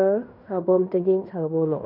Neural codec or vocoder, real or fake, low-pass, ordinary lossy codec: codec, 16 kHz in and 24 kHz out, 0.9 kbps, LongCat-Audio-Codec, fine tuned four codebook decoder; fake; 5.4 kHz; none